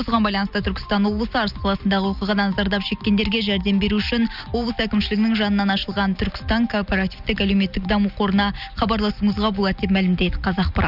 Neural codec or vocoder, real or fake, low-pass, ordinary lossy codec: none; real; 5.4 kHz; none